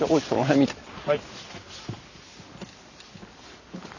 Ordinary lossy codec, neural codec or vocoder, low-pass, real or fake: none; none; 7.2 kHz; real